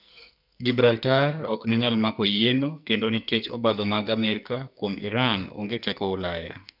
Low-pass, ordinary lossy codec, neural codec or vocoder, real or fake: 5.4 kHz; none; codec, 44.1 kHz, 2.6 kbps, SNAC; fake